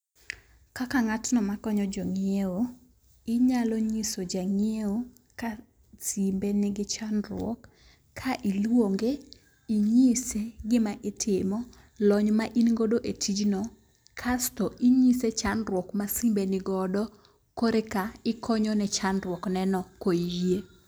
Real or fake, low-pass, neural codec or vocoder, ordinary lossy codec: real; none; none; none